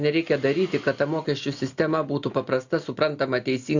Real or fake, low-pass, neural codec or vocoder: real; 7.2 kHz; none